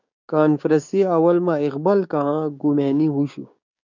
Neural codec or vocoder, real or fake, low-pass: codec, 16 kHz, 6 kbps, DAC; fake; 7.2 kHz